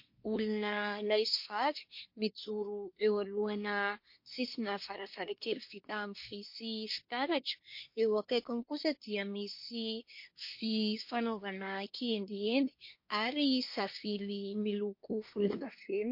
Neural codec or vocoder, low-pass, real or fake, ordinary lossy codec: codec, 24 kHz, 1 kbps, SNAC; 5.4 kHz; fake; MP3, 32 kbps